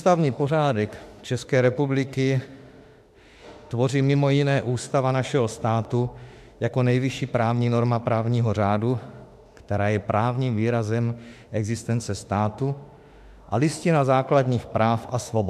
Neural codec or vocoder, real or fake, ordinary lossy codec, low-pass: autoencoder, 48 kHz, 32 numbers a frame, DAC-VAE, trained on Japanese speech; fake; AAC, 96 kbps; 14.4 kHz